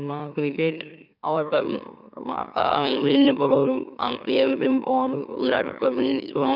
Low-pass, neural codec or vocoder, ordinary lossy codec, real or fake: 5.4 kHz; autoencoder, 44.1 kHz, a latent of 192 numbers a frame, MeloTTS; none; fake